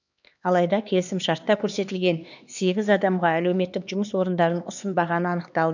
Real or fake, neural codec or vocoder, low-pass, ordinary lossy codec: fake; codec, 16 kHz, 2 kbps, X-Codec, HuBERT features, trained on LibriSpeech; 7.2 kHz; none